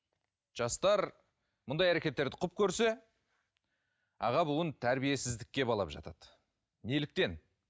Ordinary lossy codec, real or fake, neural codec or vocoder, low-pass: none; real; none; none